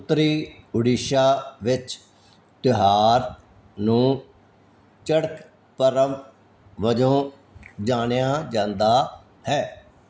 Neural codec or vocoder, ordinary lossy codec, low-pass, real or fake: none; none; none; real